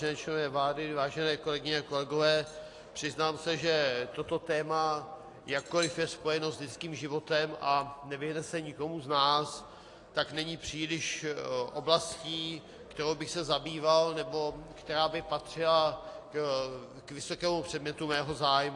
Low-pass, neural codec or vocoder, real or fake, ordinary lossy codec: 10.8 kHz; none; real; AAC, 48 kbps